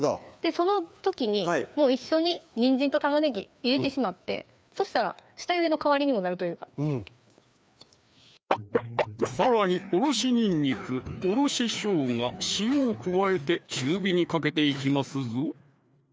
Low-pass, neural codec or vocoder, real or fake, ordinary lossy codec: none; codec, 16 kHz, 2 kbps, FreqCodec, larger model; fake; none